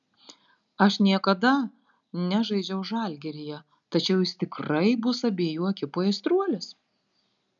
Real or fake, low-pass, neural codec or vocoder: real; 7.2 kHz; none